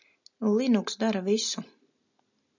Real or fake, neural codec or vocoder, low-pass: real; none; 7.2 kHz